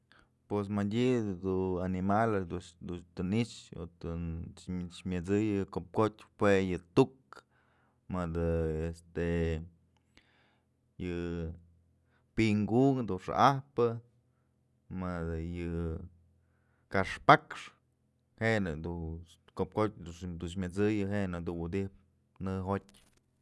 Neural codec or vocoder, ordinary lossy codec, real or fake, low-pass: none; none; real; none